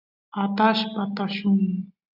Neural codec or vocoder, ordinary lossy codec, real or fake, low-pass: none; AAC, 48 kbps; real; 5.4 kHz